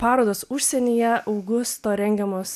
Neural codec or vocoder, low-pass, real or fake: none; 14.4 kHz; real